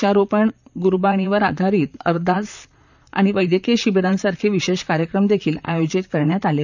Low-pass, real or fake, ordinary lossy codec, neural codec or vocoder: 7.2 kHz; fake; none; vocoder, 44.1 kHz, 128 mel bands, Pupu-Vocoder